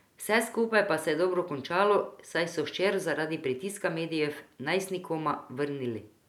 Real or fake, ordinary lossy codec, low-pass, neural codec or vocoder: real; none; 19.8 kHz; none